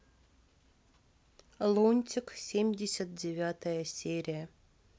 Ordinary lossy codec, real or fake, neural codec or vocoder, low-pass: none; real; none; none